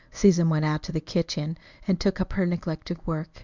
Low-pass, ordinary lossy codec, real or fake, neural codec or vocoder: 7.2 kHz; Opus, 64 kbps; fake; codec, 24 kHz, 0.9 kbps, WavTokenizer, medium speech release version 1